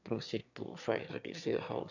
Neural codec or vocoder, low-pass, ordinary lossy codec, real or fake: autoencoder, 22.05 kHz, a latent of 192 numbers a frame, VITS, trained on one speaker; 7.2 kHz; none; fake